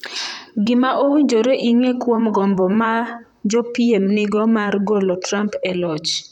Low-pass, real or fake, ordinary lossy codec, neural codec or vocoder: 19.8 kHz; fake; none; vocoder, 44.1 kHz, 128 mel bands, Pupu-Vocoder